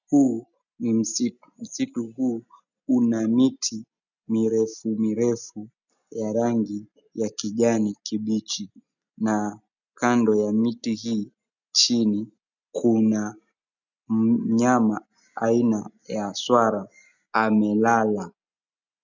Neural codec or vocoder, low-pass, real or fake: none; 7.2 kHz; real